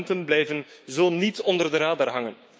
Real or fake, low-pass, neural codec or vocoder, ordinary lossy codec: fake; none; codec, 16 kHz, 6 kbps, DAC; none